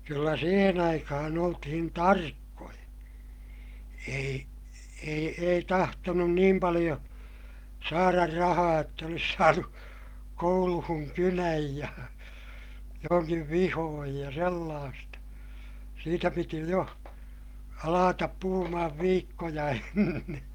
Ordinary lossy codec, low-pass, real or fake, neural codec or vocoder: Opus, 32 kbps; 19.8 kHz; real; none